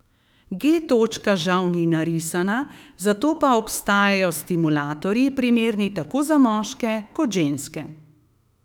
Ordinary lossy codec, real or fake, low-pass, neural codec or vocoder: none; fake; 19.8 kHz; autoencoder, 48 kHz, 32 numbers a frame, DAC-VAE, trained on Japanese speech